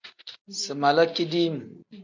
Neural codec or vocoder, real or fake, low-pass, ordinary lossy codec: none; real; 7.2 kHz; MP3, 64 kbps